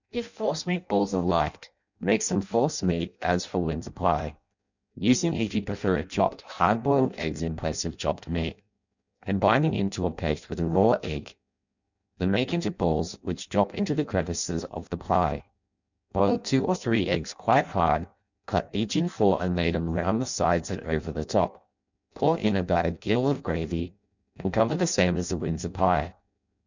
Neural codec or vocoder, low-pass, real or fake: codec, 16 kHz in and 24 kHz out, 0.6 kbps, FireRedTTS-2 codec; 7.2 kHz; fake